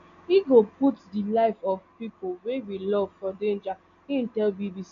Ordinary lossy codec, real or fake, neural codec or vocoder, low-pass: none; real; none; 7.2 kHz